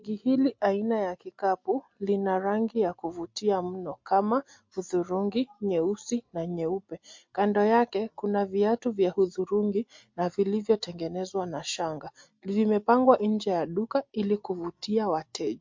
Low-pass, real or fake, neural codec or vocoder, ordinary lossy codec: 7.2 kHz; real; none; MP3, 48 kbps